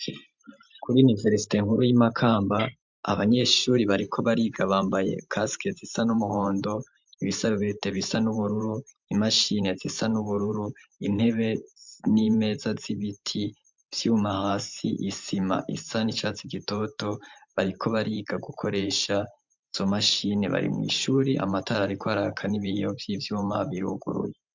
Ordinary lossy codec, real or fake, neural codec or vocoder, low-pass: MP3, 64 kbps; fake; vocoder, 44.1 kHz, 128 mel bands every 512 samples, BigVGAN v2; 7.2 kHz